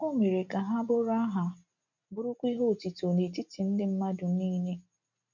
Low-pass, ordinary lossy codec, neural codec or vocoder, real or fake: 7.2 kHz; none; none; real